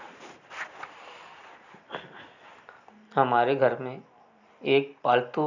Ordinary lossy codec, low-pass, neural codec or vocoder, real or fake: none; 7.2 kHz; none; real